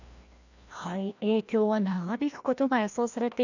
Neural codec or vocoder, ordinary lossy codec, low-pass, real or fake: codec, 16 kHz, 1 kbps, FreqCodec, larger model; none; 7.2 kHz; fake